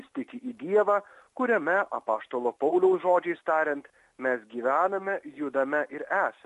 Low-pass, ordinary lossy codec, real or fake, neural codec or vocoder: 14.4 kHz; MP3, 48 kbps; real; none